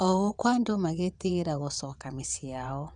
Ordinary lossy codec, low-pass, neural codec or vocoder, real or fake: none; 9.9 kHz; vocoder, 22.05 kHz, 80 mel bands, WaveNeXt; fake